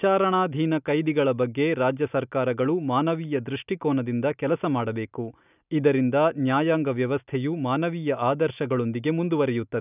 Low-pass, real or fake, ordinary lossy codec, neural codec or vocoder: 3.6 kHz; real; none; none